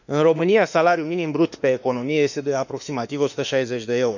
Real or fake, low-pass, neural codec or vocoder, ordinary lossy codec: fake; 7.2 kHz; autoencoder, 48 kHz, 32 numbers a frame, DAC-VAE, trained on Japanese speech; none